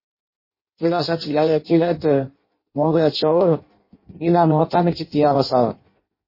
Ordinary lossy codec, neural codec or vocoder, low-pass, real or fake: MP3, 24 kbps; codec, 16 kHz in and 24 kHz out, 0.6 kbps, FireRedTTS-2 codec; 5.4 kHz; fake